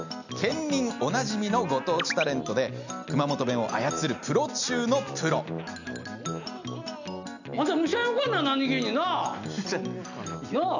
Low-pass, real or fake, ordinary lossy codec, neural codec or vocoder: 7.2 kHz; real; none; none